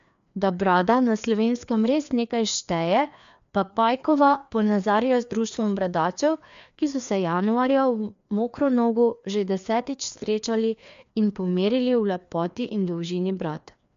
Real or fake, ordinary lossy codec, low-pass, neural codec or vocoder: fake; MP3, 64 kbps; 7.2 kHz; codec, 16 kHz, 2 kbps, FreqCodec, larger model